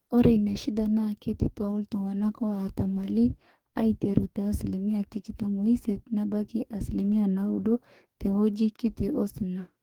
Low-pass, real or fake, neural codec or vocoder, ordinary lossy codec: 19.8 kHz; fake; codec, 44.1 kHz, 2.6 kbps, DAC; Opus, 24 kbps